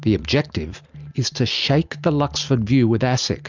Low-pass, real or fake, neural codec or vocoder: 7.2 kHz; real; none